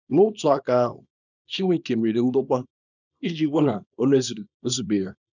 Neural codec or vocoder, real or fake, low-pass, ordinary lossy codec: codec, 24 kHz, 0.9 kbps, WavTokenizer, small release; fake; 7.2 kHz; none